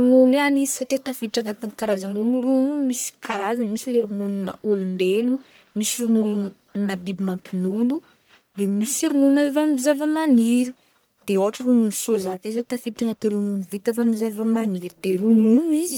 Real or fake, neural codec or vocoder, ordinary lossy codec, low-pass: fake; codec, 44.1 kHz, 1.7 kbps, Pupu-Codec; none; none